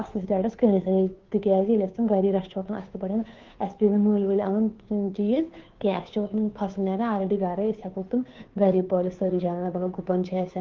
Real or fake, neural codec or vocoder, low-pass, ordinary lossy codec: fake; codec, 16 kHz, 2 kbps, FunCodec, trained on Chinese and English, 25 frames a second; 7.2 kHz; Opus, 24 kbps